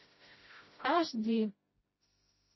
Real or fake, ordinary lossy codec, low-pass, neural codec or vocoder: fake; MP3, 24 kbps; 7.2 kHz; codec, 16 kHz, 0.5 kbps, FreqCodec, smaller model